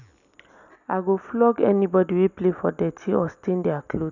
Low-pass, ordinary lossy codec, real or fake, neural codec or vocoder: 7.2 kHz; none; real; none